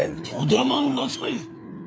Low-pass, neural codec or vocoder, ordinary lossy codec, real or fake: none; codec, 16 kHz, 4 kbps, FreqCodec, larger model; none; fake